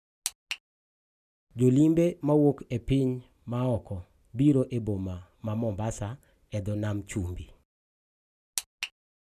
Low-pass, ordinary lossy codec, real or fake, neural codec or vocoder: 14.4 kHz; none; real; none